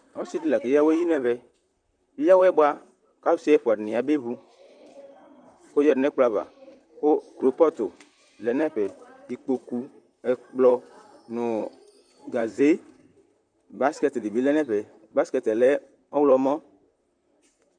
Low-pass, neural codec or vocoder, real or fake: 9.9 kHz; vocoder, 44.1 kHz, 128 mel bands, Pupu-Vocoder; fake